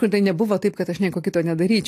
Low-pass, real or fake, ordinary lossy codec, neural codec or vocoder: 14.4 kHz; real; AAC, 64 kbps; none